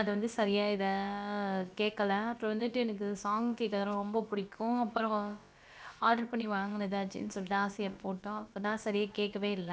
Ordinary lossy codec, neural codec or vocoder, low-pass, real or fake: none; codec, 16 kHz, about 1 kbps, DyCAST, with the encoder's durations; none; fake